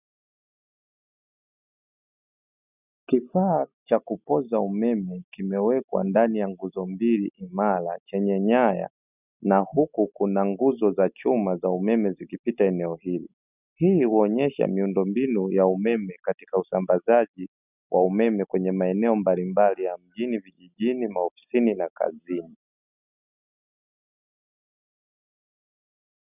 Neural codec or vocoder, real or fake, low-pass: none; real; 3.6 kHz